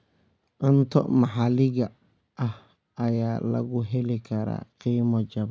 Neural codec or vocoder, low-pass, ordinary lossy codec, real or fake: none; none; none; real